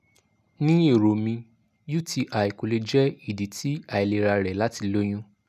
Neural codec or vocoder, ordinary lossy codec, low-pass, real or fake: none; none; 14.4 kHz; real